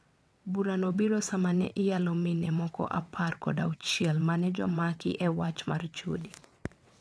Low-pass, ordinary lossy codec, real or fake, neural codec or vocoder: none; none; fake; vocoder, 22.05 kHz, 80 mel bands, WaveNeXt